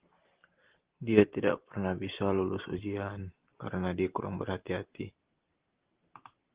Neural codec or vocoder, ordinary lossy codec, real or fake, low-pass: none; Opus, 16 kbps; real; 3.6 kHz